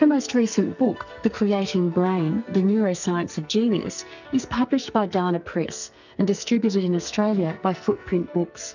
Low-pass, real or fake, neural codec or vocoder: 7.2 kHz; fake; codec, 44.1 kHz, 2.6 kbps, SNAC